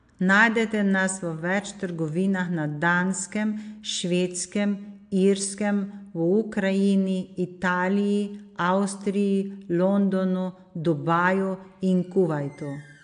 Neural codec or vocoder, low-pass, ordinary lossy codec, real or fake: none; 9.9 kHz; AAC, 64 kbps; real